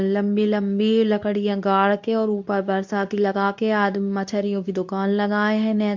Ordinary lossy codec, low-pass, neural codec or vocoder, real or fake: MP3, 64 kbps; 7.2 kHz; codec, 24 kHz, 0.9 kbps, WavTokenizer, medium speech release version 2; fake